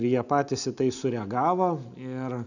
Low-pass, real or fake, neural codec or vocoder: 7.2 kHz; real; none